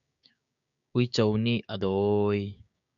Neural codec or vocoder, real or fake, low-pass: codec, 16 kHz, 6 kbps, DAC; fake; 7.2 kHz